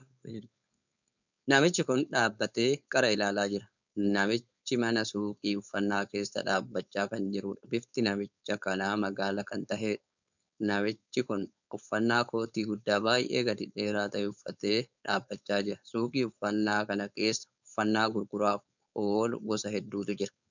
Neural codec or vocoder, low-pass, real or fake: codec, 16 kHz, 4.8 kbps, FACodec; 7.2 kHz; fake